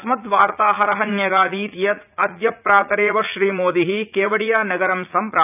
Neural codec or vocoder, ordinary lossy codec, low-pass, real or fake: vocoder, 44.1 kHz, 80 mel bands, Vocos; none; 3.6 kHz; fake